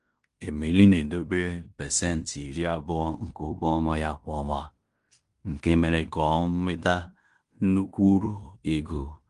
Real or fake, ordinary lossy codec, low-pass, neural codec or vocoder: fake; Opus, 24 kbps; 10.8 kHz; codec, 16 kHz in and 24 kHz out, 0.9 kbps, LongCat-Audio-Codec, fine tuned four codebook decoder